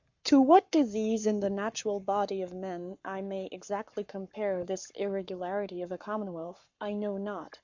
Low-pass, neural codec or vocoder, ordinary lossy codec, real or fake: 7.2 kHz; codec, 44.1 kHz, 7.8 kbps, Pupu-Codec; MP3, 64 kbps; fake